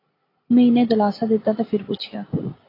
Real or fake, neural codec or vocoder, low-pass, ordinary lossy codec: real; none; 5.4 kHz; AAC, 24 kbps